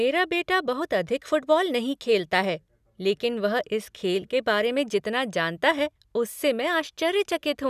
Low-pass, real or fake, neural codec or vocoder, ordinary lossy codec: 14.4 kHz; real; none; none